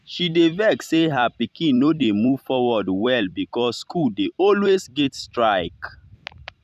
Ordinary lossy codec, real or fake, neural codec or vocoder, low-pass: none; fake; vocoder, 44.1 kHz, 128 mel bands every 256 samples, BigVGAN v2; 14.4 kHz